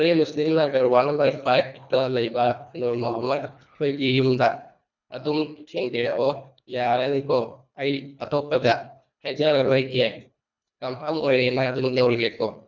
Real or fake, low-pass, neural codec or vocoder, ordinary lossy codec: fake; 7.2 kHz; codec, 24 kHz, 1.5 kbps, HILCodec; none